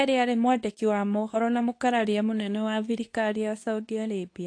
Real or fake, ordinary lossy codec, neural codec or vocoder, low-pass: fake; AAC, 48 kbps; codec, 24 kHz, 0.9 kbps, WavTokenizer, medium speech release version 2; 9.9 kHz